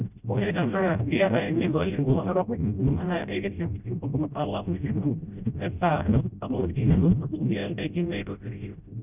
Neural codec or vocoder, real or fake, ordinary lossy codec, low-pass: codec, 16 kHz, 0.5 kbps, FreqCodec, smaller model; fake; none; 3.6 kHz